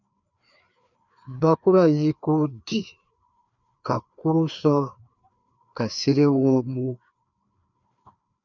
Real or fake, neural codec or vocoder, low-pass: fake; codec, 16 kHz, 2 kbps, FreqCodec, larger model; 7.2 kHz